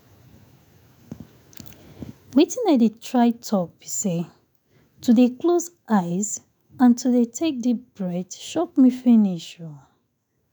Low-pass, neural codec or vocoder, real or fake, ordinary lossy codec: none; autoencoder, 48 kHz, 128 numbers a frame, DAC-VAE, trained on Japanese speech; fake; none